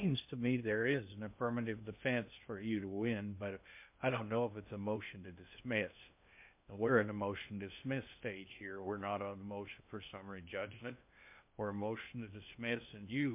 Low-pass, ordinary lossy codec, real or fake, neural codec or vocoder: 3.6 kHz; MP3, 32 kbps; fake; codec, 16 kHz in and 24 kHz out, 0.6 kbps, FocalCodec, streaming, 2048 codes